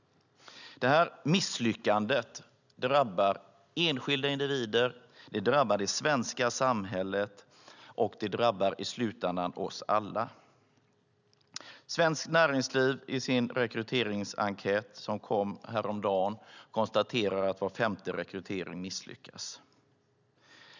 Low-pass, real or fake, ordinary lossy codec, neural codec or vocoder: 7.2 kHz; real; none; none